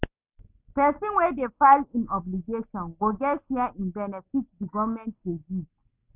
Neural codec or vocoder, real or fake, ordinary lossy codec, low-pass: none; real; none; 3.6 kHz